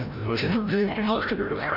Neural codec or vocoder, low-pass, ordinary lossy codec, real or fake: codec, 16 kHz, 0.5 kbps, FreqCodec, larger model; 5.4 kHz; none; fake